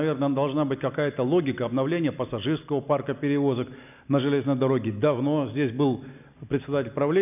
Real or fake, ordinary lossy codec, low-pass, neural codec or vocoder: real; none; 3.6 kHz; none